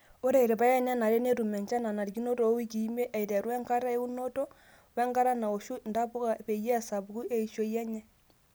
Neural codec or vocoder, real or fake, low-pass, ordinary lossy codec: none; real; none; none